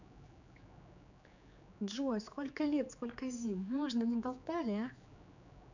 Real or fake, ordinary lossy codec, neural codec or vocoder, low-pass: fake; none; codec, 16 kHz, 4 kbps, X-Codec, HuBERT features, trained on general audio; 7.2 kHz